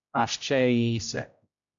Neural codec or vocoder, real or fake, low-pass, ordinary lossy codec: codec, 16 kHz, 0.5 kbps, X-Codec, HuBERT features, trained on general audio; fake; 7.2 kHz; AAC, 64 kbps